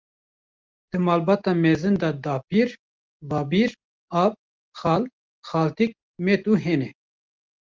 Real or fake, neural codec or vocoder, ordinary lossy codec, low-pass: real; none; Opus, 24 kbps; 7.2 kHz